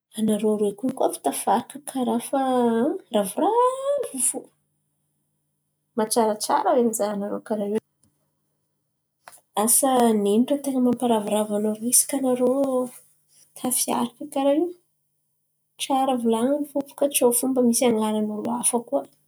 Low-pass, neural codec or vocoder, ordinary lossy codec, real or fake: none; none; none; real